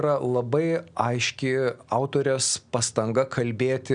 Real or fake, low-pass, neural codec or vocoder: real; 9.9 kHz; none